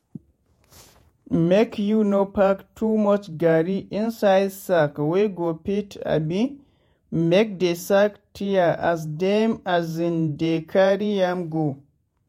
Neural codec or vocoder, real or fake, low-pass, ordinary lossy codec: vocoder, 48 kHz, 128 mel bands, Vocos; fake; 19.8 kHz; MP3, 64 kbps